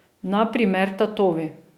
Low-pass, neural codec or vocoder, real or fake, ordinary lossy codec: 19.8 kHz; autoencoder, 48 kHz, 128 numbers a frame, DAC-VAE, trained on Japanese speech; fake; Opus, 64 kbps